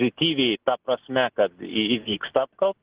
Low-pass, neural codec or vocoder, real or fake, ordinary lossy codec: 3.6 kHz; none; real; Opus, 16 kbps